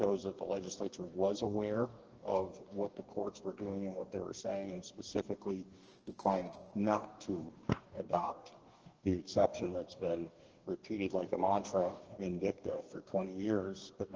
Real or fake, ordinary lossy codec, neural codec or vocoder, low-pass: fake; Opus, 16 kbps; codec, 44.1 kHz, 2.6 kbps, DAC; 7.2 kHz